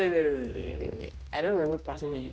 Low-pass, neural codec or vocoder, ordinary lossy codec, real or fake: none; codec, 16 kHz, 1 kbps, X-Codec, HuBERT features, trained on general audio; none; fake